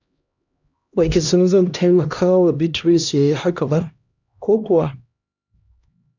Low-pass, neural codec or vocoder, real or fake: 7.2 kHz; codec, 16 kHz, 1 kbps, X-Codec, HuBERT features, trained on LibriSpeech; fake